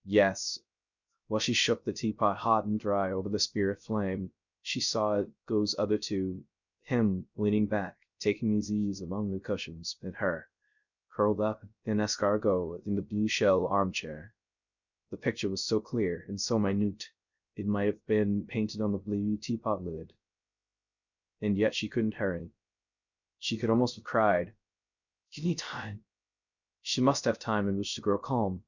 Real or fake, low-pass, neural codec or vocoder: fake; 7.2 kHz; codec, 16 kHz, 0.3 kbps, FocalCodec